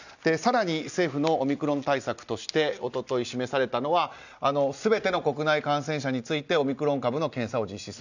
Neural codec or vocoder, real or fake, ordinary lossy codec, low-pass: none; real; none; 7.2 kHz